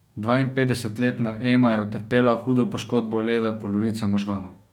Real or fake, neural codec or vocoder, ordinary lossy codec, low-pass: fake; codec, 44.1 kHz, 2.6 kbps, DAC; none; 19.8 kHz